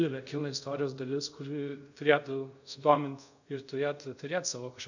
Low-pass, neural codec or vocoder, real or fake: 7.2 kHz; codec, 24 kHz, 0.5 kbps, DualCodec; fake